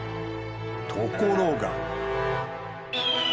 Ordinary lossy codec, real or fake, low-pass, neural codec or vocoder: none; real; none; none